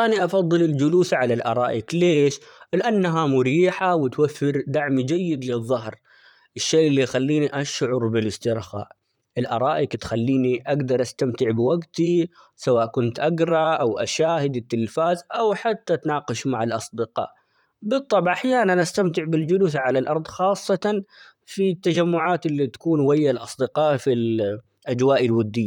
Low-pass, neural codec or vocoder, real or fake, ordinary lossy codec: 19.8 kHz; vocoder, 44.1 kHz, 128 mel bands, Pupu-Vocoder; fake; none